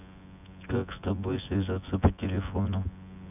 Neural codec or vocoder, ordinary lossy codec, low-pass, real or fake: vocoder, 24 kHz, 100 mel bands, Vocos; none; 3.6 kHz; fake